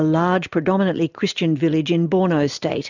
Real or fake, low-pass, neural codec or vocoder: real; 7.2 kHz; none